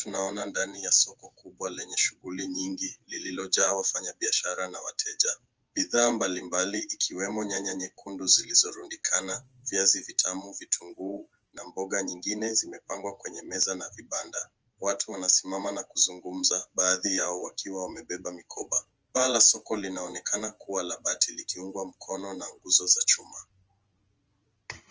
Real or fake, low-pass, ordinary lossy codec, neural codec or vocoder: fake; 7.2 kHz; Opus, 24 kbps; vocoder, 24 kHz, 100 mel bands, Vocos